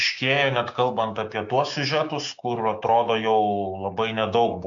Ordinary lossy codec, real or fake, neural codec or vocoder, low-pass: AAC, 64 kbps; fake; codec, 16 kHz, 6 kbps, DAC; 7.2 kHz